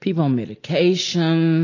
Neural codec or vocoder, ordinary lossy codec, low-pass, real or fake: none; AAC, 32 kbps; 7.2 kHz; real